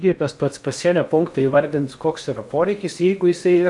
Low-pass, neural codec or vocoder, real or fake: 10.8 kHz; codec, 16 kHz in and 24 kHz out, 0.8 kbps, FocalCodec, streaming, 65536 codes; fake